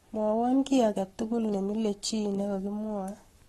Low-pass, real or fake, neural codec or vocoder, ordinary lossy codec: 19.8 kHz; fake; codec, 44.1 kHz, 7.8 kbps, Pupu-Codec; AAC, 32 kbps